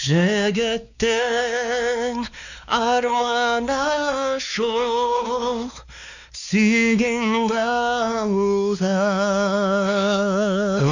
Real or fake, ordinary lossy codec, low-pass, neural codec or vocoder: fake; none; 7.2 kHz; codec, 16 kHz, 2 kbps, X-Codec, WavLM features, trained on Multilingual LibriSpeech